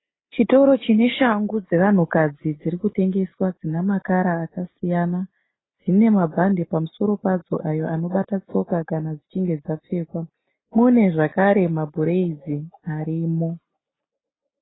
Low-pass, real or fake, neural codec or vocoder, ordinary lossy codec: 7.2 kHz; real; none; AAC, 16 kbps